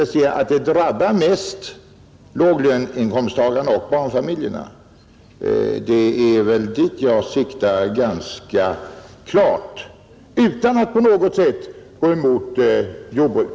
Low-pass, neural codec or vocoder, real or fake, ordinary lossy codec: none; none; real; none